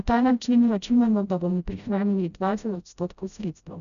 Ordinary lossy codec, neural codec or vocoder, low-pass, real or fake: none; codec, 16 kHz, 0.5 kbps, FreqCodec, smaller model; 7.2 kHz; fake